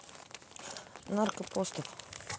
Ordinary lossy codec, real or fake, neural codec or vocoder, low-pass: none; real; none; none